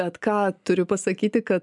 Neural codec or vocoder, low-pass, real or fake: none; 10.8 kHz; real